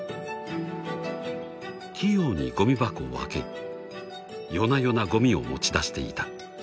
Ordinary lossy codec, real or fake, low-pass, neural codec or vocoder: none; real; none; none